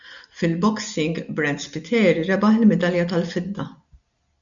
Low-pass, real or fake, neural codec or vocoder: 7.2 kHz; real; none